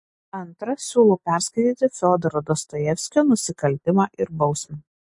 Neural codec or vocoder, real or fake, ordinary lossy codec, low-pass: none; real; MP3, 48 kbps; 10.8 kHz